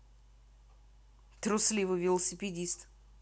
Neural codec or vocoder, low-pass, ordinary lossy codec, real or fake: none; none; none; real